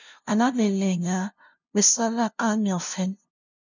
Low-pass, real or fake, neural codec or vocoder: 7.2 kHz; fake; codec, 16 kHz, 0.5 kbps, FunCodec, trained on LibriTTS, 25 frames a second